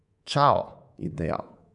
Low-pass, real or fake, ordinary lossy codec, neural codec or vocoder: 10.8 kHz; fake; none; codec, 24 kHz, 3.1 kbps, DualCodec